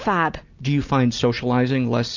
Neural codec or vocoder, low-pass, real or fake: none; 7.2 kHz; real